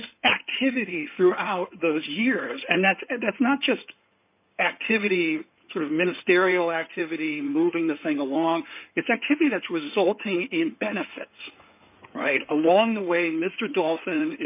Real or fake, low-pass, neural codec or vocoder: fake; 3.6 kHz; codec, 16 kHz in and 24 kHz out, 2.2 kbps, FireRedTTS-2 codec